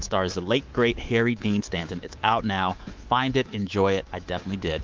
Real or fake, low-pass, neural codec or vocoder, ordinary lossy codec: fake; 7.2 kHz; codec, 24 kHz, 3.1 kbps, DualCodec; Opus, 32 kbps